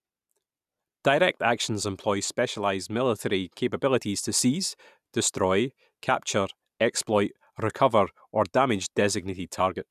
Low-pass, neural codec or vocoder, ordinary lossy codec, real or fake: 14.4 kHz; none; none; real